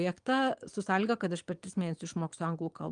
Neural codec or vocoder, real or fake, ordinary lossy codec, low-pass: vocoder, 22.05 kHz, 80 mel bands, Vocos; fake; Opus, 32 kbps; 9.9 kHz